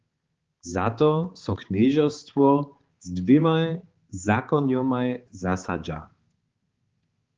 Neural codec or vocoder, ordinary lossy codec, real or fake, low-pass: codec, 16 kHz, 4 kbps, X-Codec, HuBERT features, trained on balanced general audio; Opus, 16 kbps; fake; 7.2 kHz